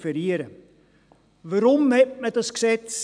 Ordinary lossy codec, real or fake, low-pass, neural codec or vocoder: none; real; 9.9 kHz; none